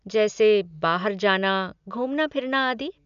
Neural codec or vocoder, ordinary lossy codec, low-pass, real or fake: none; none; 7.2 kHz; real